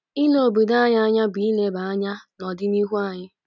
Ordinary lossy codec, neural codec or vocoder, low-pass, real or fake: none; none; 7.2 kHz; real